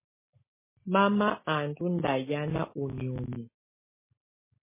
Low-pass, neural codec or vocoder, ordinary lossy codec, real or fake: 3.6 kHz; none; MP3, 16 kbps; real